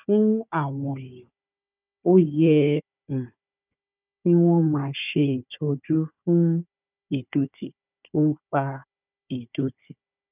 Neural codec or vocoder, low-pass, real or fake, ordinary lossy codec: codec, 16 kHz, 4 kbps, FunCodec, trained on Chinese and English, 50 frames a second; 3.6 kHz; fake; none